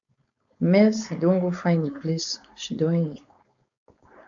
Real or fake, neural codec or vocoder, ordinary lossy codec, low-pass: fake; codec, 16 kHz, 4.8 kbps, FACodec; AAC, 64 kbps; 7.2 kHz